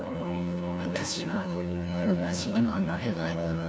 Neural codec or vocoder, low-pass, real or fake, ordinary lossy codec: codec, 16 kHz, 1 kbps, FunCodec, trained on LibriTTS, 50 frames a second; none; fake; none